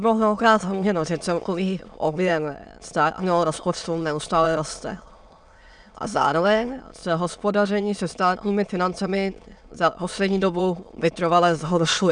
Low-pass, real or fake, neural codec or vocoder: 9.9 kHz; fake; autoencoder, 22.05 kHz, a latent of 192 numbers a frame, VITS, trained on many speakers